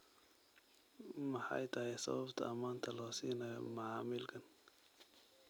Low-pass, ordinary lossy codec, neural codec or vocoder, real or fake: none; none; none; real